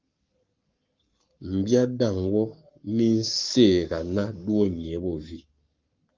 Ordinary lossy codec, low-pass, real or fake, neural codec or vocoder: Opus, 24 kbps; 7.2 kHz; fake; codec, 44.1 kHz, 7.8 kbps, Pupu-Codec